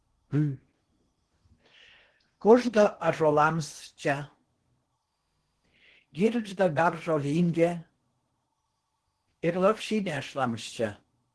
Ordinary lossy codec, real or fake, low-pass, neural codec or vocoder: Opus, 16 kbps; fake; 10.8 kHz; codec, 16 kHz in and 24 kHz out, 0.8 kbps, FocalCodec, streaming, 65536 codes